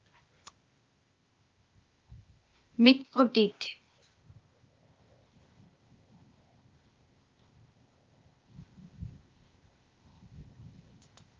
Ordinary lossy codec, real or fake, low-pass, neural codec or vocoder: Opus, 24 kbps; fake; 7.2 kHz; codec, 16 kHz, 0.8 kbps, ZipCodec